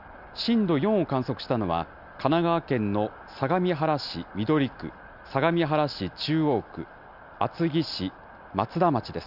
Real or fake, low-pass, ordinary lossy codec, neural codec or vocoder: real; 5.4 kHz; none; none